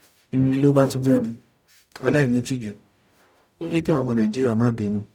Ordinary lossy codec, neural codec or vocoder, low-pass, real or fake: none; codec, 44.1 kHz, 0.9 kbps, DAC; 19.8 kHz; fake